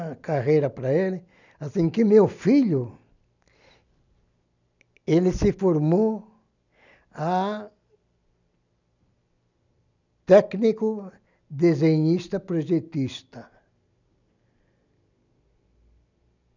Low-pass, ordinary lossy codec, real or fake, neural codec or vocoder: 7.2 kHz; none; real; none